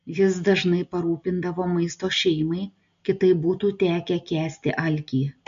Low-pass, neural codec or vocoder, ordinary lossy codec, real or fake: 7.2 kHz; none; MP3, 48 kbps; real